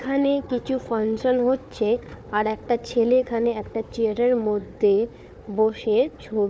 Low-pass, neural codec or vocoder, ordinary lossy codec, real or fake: none; codec, 16 kHz, 4 kbps, FunCodec, trained on Chinese and English, 50 frames a second; none; fake